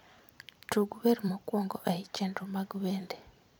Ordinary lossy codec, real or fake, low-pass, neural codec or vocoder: none; fake; none; vocoder, 44.1 kHz, 128 mel bands every 256 samples, BigVGAN v2